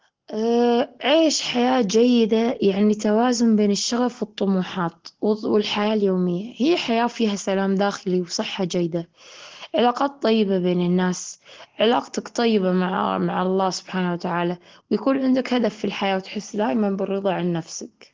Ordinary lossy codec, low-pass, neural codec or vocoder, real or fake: Opus, 16 kbps; 7.2 kHz; none; real